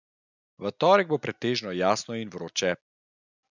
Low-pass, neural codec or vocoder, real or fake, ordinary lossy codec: 7.2 kHz; none; real; none